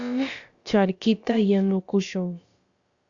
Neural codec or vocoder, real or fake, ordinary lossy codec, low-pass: codec, 16 kHz, about 1 kbps, DyCAST, with the encoder's durations; fake; Opus, 64 kbps; 7.2 kHz